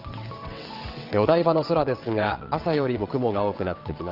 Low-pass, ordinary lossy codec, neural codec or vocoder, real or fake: 5.4 kHz; Opus, 32 kbps; vocoder, 22.05 kHz, 80 mel bands, WaveNeXt; fake